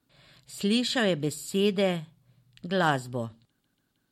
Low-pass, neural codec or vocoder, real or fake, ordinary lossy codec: 19.8 kHz; none; real; MP3, 64 kbps